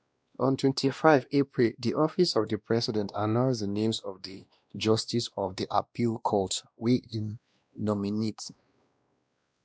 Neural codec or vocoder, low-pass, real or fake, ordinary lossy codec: codec, 16 kHz, 1 kbps, X-Codec, WavLM features, trained on Multilingual LibriSpeech; none; fake; none